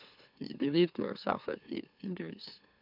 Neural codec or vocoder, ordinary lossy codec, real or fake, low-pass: autoencoder, 44.1 kHz, a latent of 192 numbers a frame, MeloTTS; none; fake; 5.4 kHz